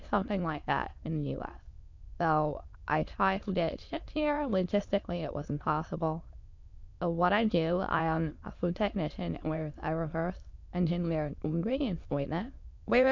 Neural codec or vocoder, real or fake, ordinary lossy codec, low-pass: autoencoder, 22.05 kHz, a latent of 192 numbers a frame, VITS, trained on many speakers; fake; MP3, 64 kbps; 7.2 kHz